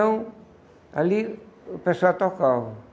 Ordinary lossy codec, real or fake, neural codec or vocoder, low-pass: none; real; none; none